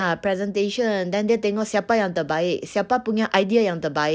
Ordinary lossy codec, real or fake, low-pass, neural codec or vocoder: none; real; none; none